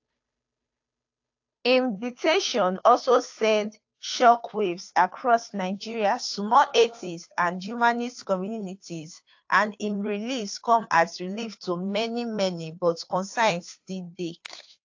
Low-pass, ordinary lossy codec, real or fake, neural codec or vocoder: 7.2 kHz; AAC, 48 kbps; fake; codec, 16 kHz, 2 kbps, FunCodec, trained on Chinese and English, 25 frames a second